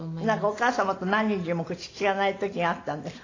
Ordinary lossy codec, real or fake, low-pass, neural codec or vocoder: AAC, 32 kbps; real; 7.2 kHz; none